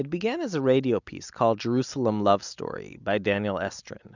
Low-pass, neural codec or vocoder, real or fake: 7.2 kHz; none; real